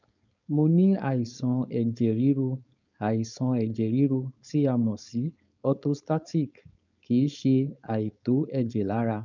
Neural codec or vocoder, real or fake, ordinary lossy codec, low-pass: codec, 16 kHz, 4.8 kbps, FACodec; fake; none; 7.2 kHz